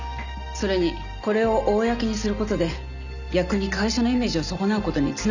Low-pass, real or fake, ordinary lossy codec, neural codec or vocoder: 7.2 kHz; real; none; none